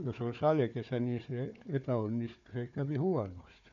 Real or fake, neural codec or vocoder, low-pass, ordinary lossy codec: fake; codec, 16 kHz, 4 kbps, FunCodec, trained on Chinese and English, 50 frames a second; 7.2 kHz; none